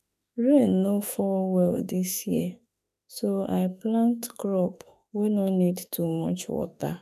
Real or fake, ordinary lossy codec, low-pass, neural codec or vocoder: fake; none; 14.4 kHz; autoencoder, 48 kHz, 32 numbers a frame, DAC-VAE, trained on Japanese speech